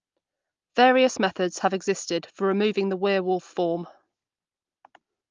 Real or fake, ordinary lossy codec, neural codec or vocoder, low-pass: real; Opus, 32 kbps; none; 7.2 kHz